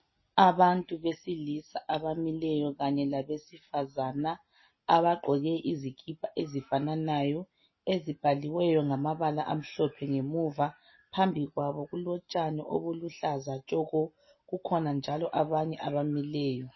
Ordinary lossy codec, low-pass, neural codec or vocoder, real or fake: MP3, 24 kbps; 7.2 kHz; none; real